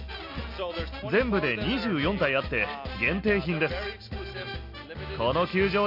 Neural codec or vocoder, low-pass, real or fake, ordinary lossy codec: none; 5.4 kHz; real; none